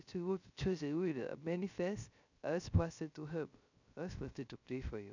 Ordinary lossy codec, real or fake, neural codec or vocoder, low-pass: none; fake; codec, 16 kHz, 0.3 kbps, FocalCodec; 7.2 kHz